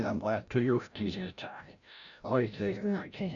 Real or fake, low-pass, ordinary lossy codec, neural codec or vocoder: fake; 7.2 kHz; none; codec, 16 kHz, 0.5 kbps, FreqCodec, larger model